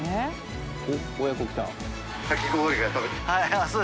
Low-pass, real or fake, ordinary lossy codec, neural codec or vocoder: none; real; none; none